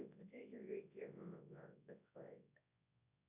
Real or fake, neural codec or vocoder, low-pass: fake; codec, 24 kHz, 0.9 kbps, WavTokenizer, large speech release; 3.6 kHz